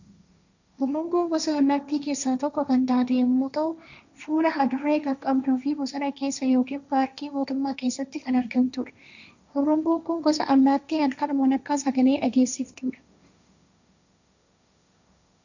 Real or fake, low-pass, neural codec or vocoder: fake; 7.2 kHz; codec, 16 kHz, 1.1 kbps, Voila-Tokenizer